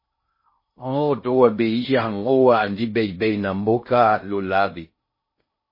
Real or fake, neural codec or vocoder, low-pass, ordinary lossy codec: fake; codec, 16 kHz in and 24 kHz out, 0.6 kbps, FocalCodec, streaming, 2048 codes; 5.4 kHz; MP3, 24 kbps